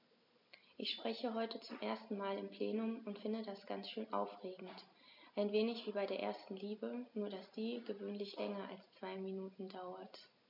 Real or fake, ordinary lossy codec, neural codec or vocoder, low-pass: real; MP3, 48 kbps; none; 5.4 kHz